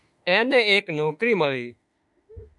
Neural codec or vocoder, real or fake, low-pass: autoencoder, 48 kHz, 32 numbers a frame, DAC-VAE, trained on Japanese speech; fake; 10.8 kHz